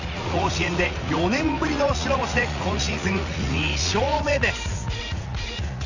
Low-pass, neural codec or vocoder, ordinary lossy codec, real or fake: 7.2 kHz; vocoder, 44.1 kHz, 80 mel bands, Vocos; none; fake